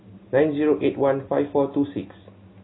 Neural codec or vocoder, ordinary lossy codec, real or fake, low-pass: none; AAC, 16 kbps; real; 7.2 kHz